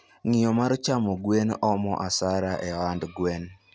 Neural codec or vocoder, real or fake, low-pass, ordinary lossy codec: none; real; none; none